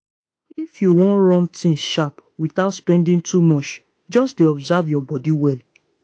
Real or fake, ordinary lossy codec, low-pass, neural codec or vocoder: fake; AAC, 48 kbps; 9.9 kHz; autoencoder, 48 kHz, 32 numbers a frame, DAC-VAE, trained on Japanese speech